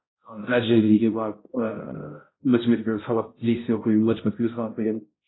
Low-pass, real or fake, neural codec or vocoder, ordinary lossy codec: 7.2 kHz; fake; codec, 16 kHz, 0.5 kbps, X-Codec, HuBERT features, trained on balanced general audio; AAC, 16 kbps